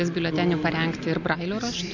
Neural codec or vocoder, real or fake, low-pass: none; real; 7.2 kHz